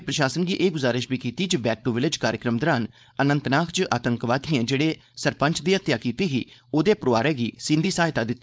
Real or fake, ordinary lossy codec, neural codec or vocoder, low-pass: fake; none; codec, 16 kHz, 4.8 kbps, FACodec; none